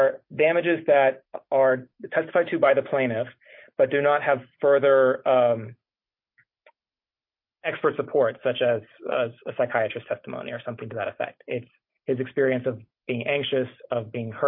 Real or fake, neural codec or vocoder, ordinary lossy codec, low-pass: real; none; MP3, 32 kbps; 5.4 kHz